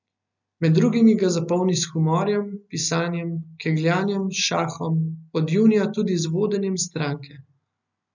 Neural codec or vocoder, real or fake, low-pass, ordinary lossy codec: none; real; 7.2 kHz; none